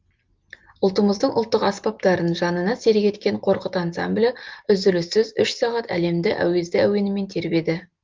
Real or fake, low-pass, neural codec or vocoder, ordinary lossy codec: real; 7.2 kHz; none; Opus, 32 kbps